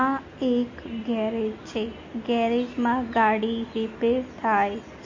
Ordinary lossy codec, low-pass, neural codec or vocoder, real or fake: MP3, 32 kbps; 7.2 kHz; none; real